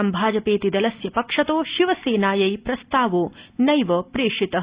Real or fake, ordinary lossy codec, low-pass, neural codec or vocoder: real; Opus, 64 kbps; 3.6 kHz; none